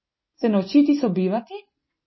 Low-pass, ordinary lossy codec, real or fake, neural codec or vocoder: 7.2 kHz; MP3, 24 kbps; real; none